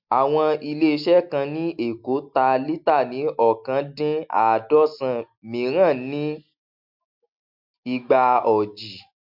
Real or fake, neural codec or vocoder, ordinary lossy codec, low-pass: real; none; MP3, 48 kbps; 5.4 kHz